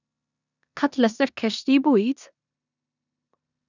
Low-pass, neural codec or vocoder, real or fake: 7.2 kHz; codec, 16 kHz in and 24 kHz out, 0.9 kbps, LongCat-Audio-Codec, four codebook decoder; fake